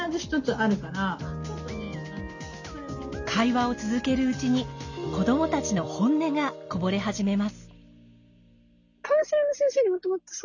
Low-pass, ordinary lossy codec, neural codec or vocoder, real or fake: 7.2 kHz; none; none; real